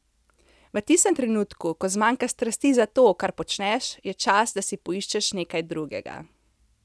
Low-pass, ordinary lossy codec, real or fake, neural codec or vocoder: none; none; real; none